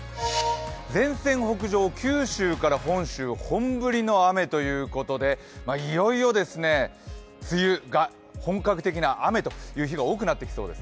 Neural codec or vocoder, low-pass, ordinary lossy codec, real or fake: none; none; none; real